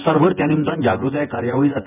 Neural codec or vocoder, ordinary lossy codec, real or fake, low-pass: vocoder, 24 kHz, 100 mel bands, Vocos; none; fake; 3.6 kHz